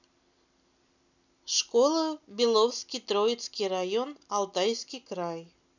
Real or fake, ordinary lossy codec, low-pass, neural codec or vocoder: real; none; 7.2 kHz; none